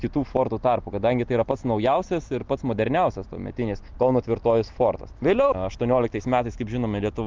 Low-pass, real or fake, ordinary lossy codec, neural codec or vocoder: 7.2 kHz; real; Opus, 32 kbps; none